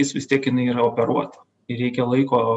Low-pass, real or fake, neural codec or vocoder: 10.8 kHz; real; none